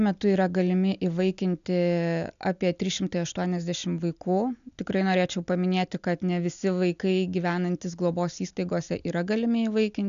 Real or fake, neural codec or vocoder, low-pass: real; none; 7.2 kHz